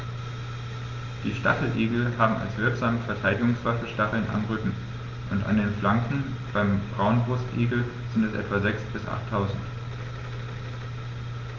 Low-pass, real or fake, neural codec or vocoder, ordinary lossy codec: 7.2 kHz; real; none; Opus, 32 kbps